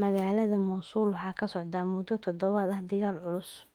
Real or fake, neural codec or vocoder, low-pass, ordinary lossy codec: fake; autoencoder, 48 kHz, 32 numbers a frame, DAC-VAE, trained on Japanese speech; 19.8 kHz; Opus, 32 kbps